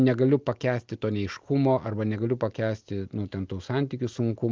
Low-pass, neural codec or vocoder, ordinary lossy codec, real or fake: 7.2 kHz; none; Opus, 32 kbps; real